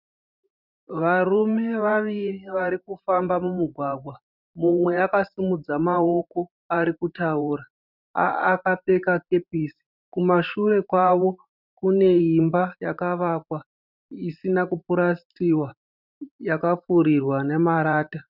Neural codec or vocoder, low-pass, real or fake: vocoder, 44.1 kHz, 128 mel bands every 512 samples, BigVGAN v2; 5.4 kHz; fake